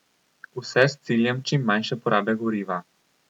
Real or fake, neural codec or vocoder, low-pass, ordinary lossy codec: real; none; 19.8 kHz; none